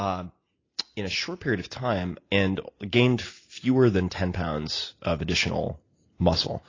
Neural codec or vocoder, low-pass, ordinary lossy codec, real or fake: none; 7.2 kHz; AAC, 32 kbps; real